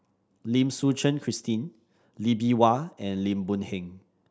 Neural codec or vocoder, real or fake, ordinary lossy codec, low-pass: none; real; none; none